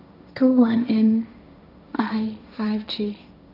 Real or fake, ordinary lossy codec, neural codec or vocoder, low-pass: fake; none; codec, 16 kHz, 1.1 kbps, Voila-Tokenizer; 5.4 kHz